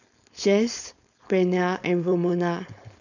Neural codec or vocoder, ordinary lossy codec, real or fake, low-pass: codec, 16 kHz, 4.8 kbps, FACodec; none; fake; 7.2 kHz